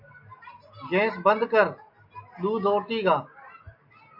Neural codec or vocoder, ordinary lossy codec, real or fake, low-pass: none; AAC, 48 kbps; real; 5.4 kHz